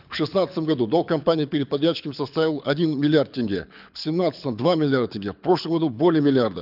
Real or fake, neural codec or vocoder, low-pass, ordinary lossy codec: fake; codec, 24 kHz, 6 kbps, HILCodec; 5.4 kHz; none